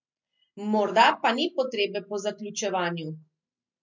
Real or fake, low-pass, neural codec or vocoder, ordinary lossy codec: real; 7.2 kHz; none; MP3, 48 kbps